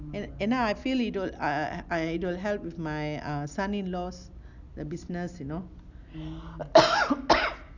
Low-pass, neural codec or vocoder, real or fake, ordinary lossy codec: 7.2 kHz; none; real; none